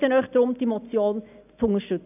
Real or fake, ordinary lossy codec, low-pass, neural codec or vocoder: real; none; 3.6 kHz; none